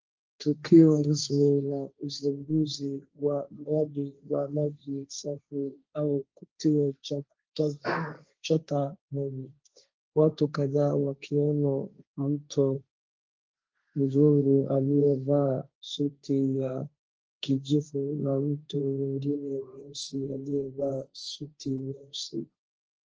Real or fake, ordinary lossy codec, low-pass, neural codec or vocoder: fake; Opus, 24 kbps; 7.2 kHz; codec, 16 kHz, 1.1 kbps, Voila-Tokenizer